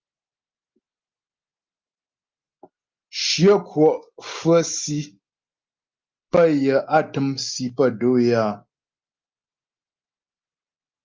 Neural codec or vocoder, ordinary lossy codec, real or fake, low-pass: none; Opus, 24 kbps; real; 7.2 kHz